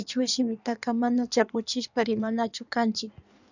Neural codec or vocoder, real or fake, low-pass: codec, 16 kHz in and 24 kHz out, 1.1 kbps, FireRedTTS-2 codec; fake; 7.2 kHz